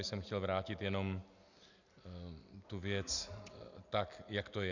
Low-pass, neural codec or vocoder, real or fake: 7.2 kHz; none; real